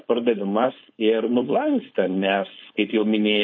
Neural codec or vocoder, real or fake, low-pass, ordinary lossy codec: codec, 16 kHz, 4.8 kbps, FACodec; fake; 7.2 kHz; MP3, 32 kbps